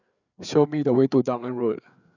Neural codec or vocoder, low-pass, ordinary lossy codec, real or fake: codec, 16 kHz, 4 kbps, FreqCodec, larger model; 7.2 kHz; none; fake